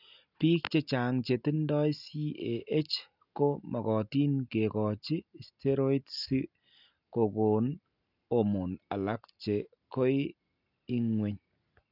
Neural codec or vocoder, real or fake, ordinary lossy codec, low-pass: none; real; none; 5.4 kHz